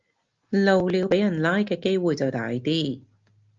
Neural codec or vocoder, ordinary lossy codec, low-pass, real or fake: none; Opus, 24 kbps; 7.2 kHz; real